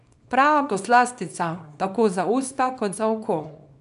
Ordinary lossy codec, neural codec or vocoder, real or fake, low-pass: none; codec, 24 kHz, 0.9 kbps, WavTokenizer, small release; fake; 10.8 kHz